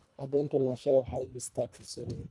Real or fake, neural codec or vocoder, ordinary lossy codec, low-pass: fake; codec, 24 kHz, 1.5 kbps, HILCodec; none; none